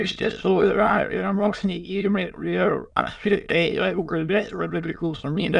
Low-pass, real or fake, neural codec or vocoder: 9.9 kHz; fake; autoencoder, 22.05 kHz, a latent of 192 numbers a frame, VITS, trained on many speakers